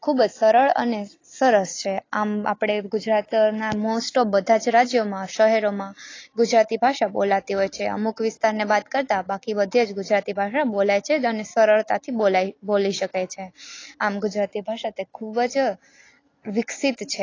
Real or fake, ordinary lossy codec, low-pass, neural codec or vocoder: real; AAC, 32 kbps; 7.2 kHz; none